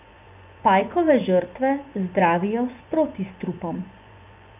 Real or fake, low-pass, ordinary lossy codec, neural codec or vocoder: fake; 3.6 kHz; none; vocoder, 24 kHz, 100 mel bands, Vocos